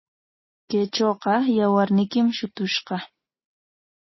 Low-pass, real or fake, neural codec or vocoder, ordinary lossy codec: 7.2 kHz; real; none; MP3, 24 kbps